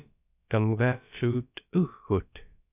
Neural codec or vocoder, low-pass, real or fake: codec, 16 kHz, about 1 kbps, DyCAST, with the encoder's durations; 3.6 kHz; fake